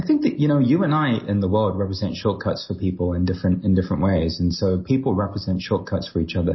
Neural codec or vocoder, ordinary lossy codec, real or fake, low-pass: none; MP3, 24 kbps; real; 7.2 kHz